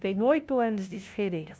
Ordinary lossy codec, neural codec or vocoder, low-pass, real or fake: none; codec, 16 kHz, 0.5 kbps, FunCodec, trained on LibriTTS, 25 frames a second; none; fake